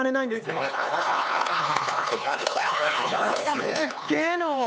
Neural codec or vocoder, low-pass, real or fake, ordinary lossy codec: codec, 16 kHz, 4 kbps, X-Codec, HuBERT features, trained on LibriSpeech; none; fake; none